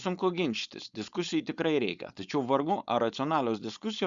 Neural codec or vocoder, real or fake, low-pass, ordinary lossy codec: codec, 16 kHz, 4.8 kbps, FACodec; fake; 7.2 kHz; Opus, 64 kbps